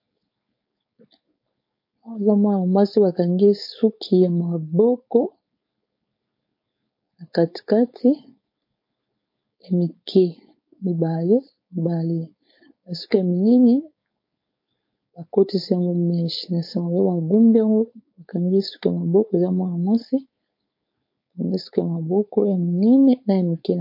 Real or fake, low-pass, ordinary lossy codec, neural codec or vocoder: fake; 5.4 kHz; MP3, 32 kbps; codec, 16 kHz, 4.8 kbps, FACodec